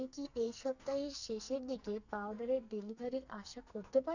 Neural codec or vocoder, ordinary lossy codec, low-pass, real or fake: codec, 44.1 kHz, 2.6 kbps, SNAC; none; 7.2 kHz; fake